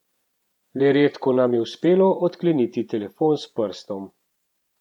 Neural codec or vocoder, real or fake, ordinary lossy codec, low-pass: vocoder, 48 kHz, 128 mel bands, Vocos; fake; none; 19.8 kHz